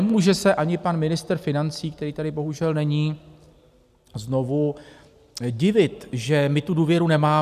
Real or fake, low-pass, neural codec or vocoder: real; 14.4 kHz; none